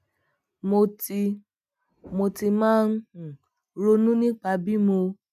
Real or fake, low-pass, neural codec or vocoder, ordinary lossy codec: real; 14.4 kHz; none; none